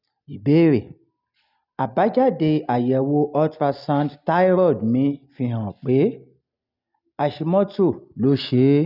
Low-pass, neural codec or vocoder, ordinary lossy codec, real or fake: 5.4 kHz; none; none; real